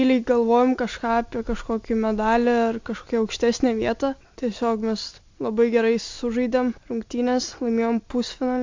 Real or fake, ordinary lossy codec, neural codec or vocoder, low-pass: real; MP3, 48 kbps; none; 7.2 kHz